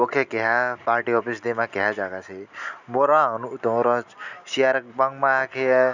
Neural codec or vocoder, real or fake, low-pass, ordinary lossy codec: none; real; 7.2 kHz; none